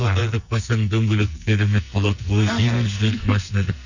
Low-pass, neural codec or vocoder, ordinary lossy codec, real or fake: 7.2 kHz; codec, 44.1 kHz, 2.6 kbps, SNAC; none; fake